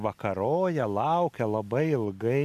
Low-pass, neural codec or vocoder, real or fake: 14.4 kHz; none; real